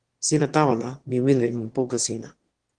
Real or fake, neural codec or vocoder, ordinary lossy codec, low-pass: fake; autoencoder, 22.05 kHz, a latent of 192 numbers a frame, VITS, trained on one speaker; Opus, 16 kbps; 9.9 kHz